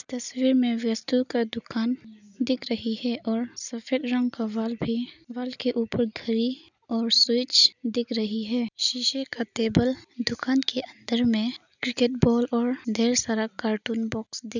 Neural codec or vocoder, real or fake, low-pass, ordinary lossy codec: none; real; 7.2 kHz; none